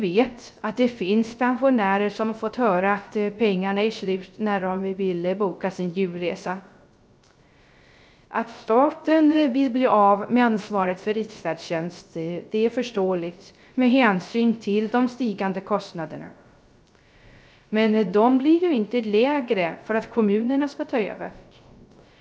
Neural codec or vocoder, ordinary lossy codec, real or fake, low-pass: codec, 16 kHz, 0.3 kbps, FocalCodec; none; fake; none